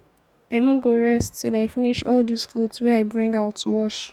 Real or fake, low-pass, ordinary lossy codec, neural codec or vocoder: fake; 19.8 kHz; none; codec, 44.1 kHz, 2.6 kbps, DAC